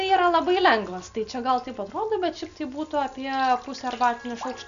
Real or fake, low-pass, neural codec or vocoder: real; 7.2 kHz; none